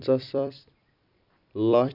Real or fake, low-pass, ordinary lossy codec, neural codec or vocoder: fake; 5.4 kHz; none; vocoder, 44.1 kHz, 128 mel bands every 256 samples, BigVGAN v2